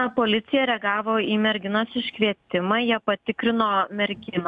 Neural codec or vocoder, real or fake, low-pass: none; real; 10.8 kHz